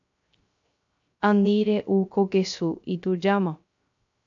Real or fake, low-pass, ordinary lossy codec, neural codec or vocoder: fake; 7.2 kHz; AAC, 64 kbps; codec, 16 kHz, 0.3 kbps, FocalCodec